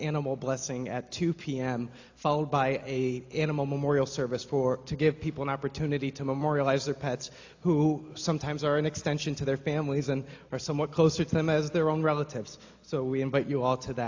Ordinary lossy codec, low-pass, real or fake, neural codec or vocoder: AAC, 48 kbps; 7.2 kHz; real; none